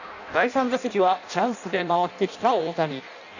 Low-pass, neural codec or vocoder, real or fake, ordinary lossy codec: 7.2 kHz; codec, 16 kHz in and 24 kHz out, 0.6 kbps, FireRedTTS-2 codec; fake; none